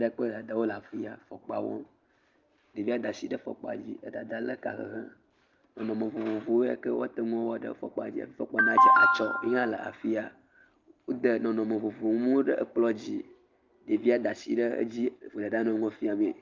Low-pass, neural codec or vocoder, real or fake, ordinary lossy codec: 7.2 kHz; none; real; Opus, 24 kbps